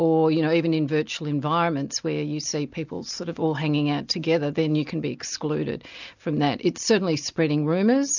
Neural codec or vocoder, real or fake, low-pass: none; real; 7.2 kHz